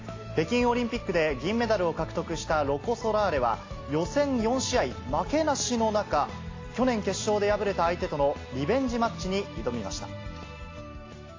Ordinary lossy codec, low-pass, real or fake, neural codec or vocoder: AAC, 32 kbps; 7.2 kHz; real; none